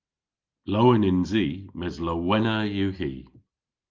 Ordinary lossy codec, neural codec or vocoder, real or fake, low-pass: Opus, 32 kbps; none; real; 7.2 kHz